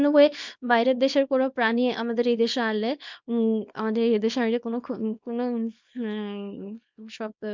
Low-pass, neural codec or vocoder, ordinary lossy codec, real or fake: 7.2 kHz; codec, 24 kHz, 0.9 kbps, WavTokenizer, small release; MP3, 64 kbps; fake